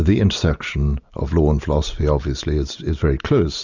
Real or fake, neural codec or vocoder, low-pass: real; none; 7.2 kHz